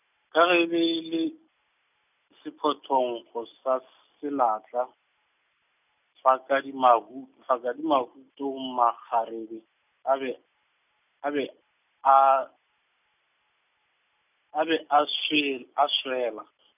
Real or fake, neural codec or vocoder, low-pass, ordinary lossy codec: real; none; 3.6 kHz; none